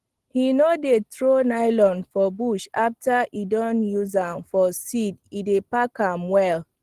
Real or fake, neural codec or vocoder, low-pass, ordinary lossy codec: real; none; 14.4 kHz; Opus, 16 kbps